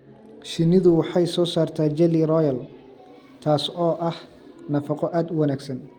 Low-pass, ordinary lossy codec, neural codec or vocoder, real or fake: 19.8 kHz; Opus, 32 kbps; none; real